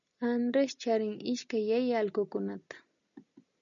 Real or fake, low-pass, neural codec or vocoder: real; 7.2 kHz; none